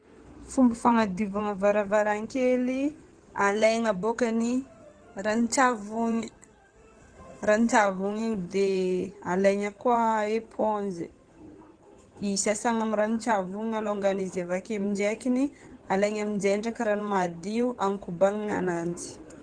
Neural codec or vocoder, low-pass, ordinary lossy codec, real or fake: codec, 16 kHz in and 24 kHz out, 2.2 kbps, FireRedTTS-2 codec; 9.9 kHz; Opus, 16 kbps; fake